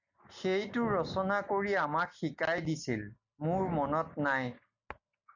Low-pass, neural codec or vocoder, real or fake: 7.2 kHz; none; real